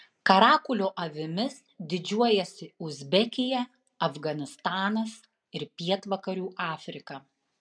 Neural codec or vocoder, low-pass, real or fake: none; 9.9 kHz; real